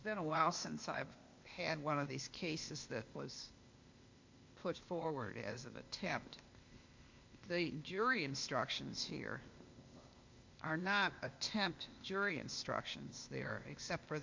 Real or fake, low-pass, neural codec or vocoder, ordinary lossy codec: fake; 7.2 kHz; codec, 16 kHz, 0.8 kbps, ZipCodec; MP3, 48 kbps